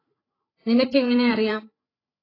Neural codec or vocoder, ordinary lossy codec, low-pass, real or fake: codec, 16 kHz, 8 kbps, FreqCodec, larger model; AAC, 24 kbps; 5.4 kHz; fake